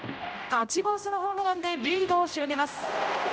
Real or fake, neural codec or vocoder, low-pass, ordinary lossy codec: fake; codec, 16 kHz, 0.5 kbps, X-Codec, HuBERT features, trained on general audio; none; none